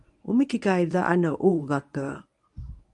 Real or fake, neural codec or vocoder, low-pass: fake; codec, 24 kHz, 0.9 kbps, WavTokenizer, medium speech release version 1; 10.8 kHz